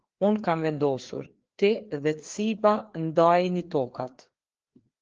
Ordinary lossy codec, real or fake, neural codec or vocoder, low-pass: Opus, 16 kbps; fake; codec, 16 kHz, 2 kbps, FreqCodec, larger model; 7.2 kHz